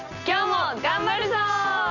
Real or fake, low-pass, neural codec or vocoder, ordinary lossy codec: real; 7.2 kHz; none; none